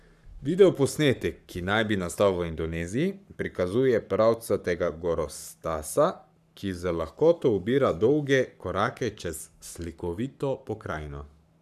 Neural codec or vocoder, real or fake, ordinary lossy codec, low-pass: codec, 44.1 kHz, 7.8 kbps, Pupu-Codec; fake; none; 14.4 kHz